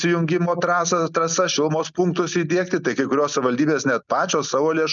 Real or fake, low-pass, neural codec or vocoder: real; 7.2 kHz; none